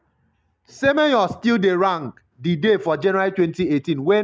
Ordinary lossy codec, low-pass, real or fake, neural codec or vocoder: none; none; real; none